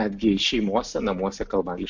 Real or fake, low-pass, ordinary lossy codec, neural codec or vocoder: real; 7.2 kHz; MP3, 64 kbps; none